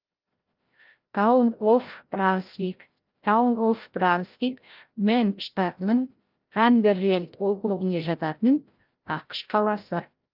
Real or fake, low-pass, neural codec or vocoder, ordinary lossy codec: fake; 5.4 kHz; codec, 16 kHz, 0.5 kbps, FreqCodec, larger model; Opus, 24 kbps